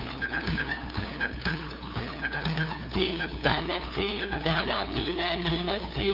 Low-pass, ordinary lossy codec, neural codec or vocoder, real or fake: 5.4 kHz; none; codec, 16 kHz, 2 kbps, FunCodec, trained on LibriTTS, 25 frames a second; fake